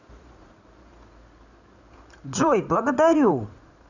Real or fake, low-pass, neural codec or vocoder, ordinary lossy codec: fake; 7.2 kHz; codec, 16 kHz in and 24 kHz out, 2.2 kbps, FireRedTTS-2 codec; none